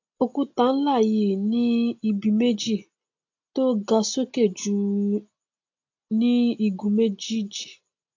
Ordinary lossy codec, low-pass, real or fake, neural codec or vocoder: AAC, 48 kbps; 7.2 kHz; real; none